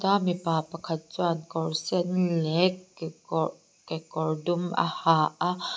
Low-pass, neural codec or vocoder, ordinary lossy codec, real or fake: none; none; none; real